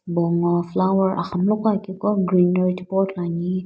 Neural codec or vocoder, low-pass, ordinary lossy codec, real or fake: none; none; none; real